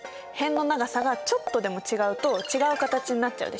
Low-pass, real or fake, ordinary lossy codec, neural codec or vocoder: none; real; none; none